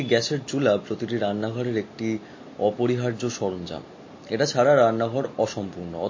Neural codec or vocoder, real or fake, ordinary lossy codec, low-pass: none; real; MP3, 32 kbps; 7.2 kHz